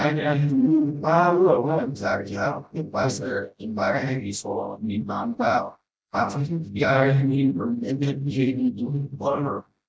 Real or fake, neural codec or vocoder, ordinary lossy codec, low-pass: fake; codec, 16 kHz, 0.5 kbps, FreqCodec, smaller model; none; none